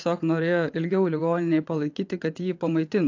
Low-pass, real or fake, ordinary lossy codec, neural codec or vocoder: 7.2 kHz; fake; AAC, 48 kbps; vocoder, 22.05 kHz, 80 mel bands, WaveNeXt